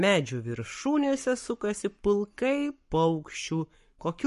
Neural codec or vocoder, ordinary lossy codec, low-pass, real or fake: codec, 44.1 kHz, 7.8 kbps, Pupu-Codec; MP3, 48 kbps; 14.4 kHz; fake